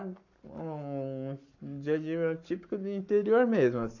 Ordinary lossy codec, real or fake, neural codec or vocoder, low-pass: none; fake; codec, 44.1 kHz, 7.8 kbps, Pupu-Codec; 7.2 kHz